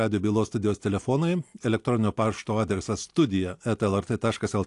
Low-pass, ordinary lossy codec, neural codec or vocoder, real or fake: 10.8 kHz; AAC, 64 kbps; none; real